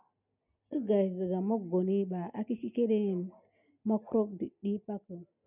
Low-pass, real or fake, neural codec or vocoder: 3.6 kHz; real; none